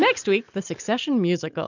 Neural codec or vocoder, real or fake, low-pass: none; real; 7.2 kHz